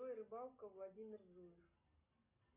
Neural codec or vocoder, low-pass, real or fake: none; 3.6 kHz; real